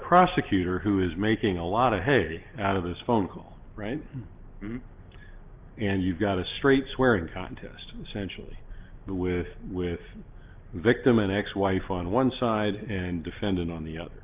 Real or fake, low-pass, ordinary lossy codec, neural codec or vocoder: real; 3.6 kHz; Opus, 32 kbps; none